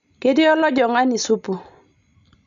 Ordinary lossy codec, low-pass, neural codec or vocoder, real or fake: none; 7.2 kHz; none; real